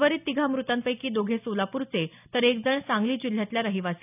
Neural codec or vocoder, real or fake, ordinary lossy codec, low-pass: none; real; none; 3.6 kHz